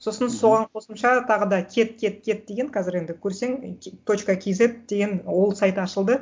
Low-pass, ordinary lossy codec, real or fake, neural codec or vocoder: none; none; real; none